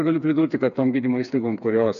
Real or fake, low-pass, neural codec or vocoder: fake; 7.2 kHz; codec, 16 kHz, 4 kbps, FreqCodec, smaller model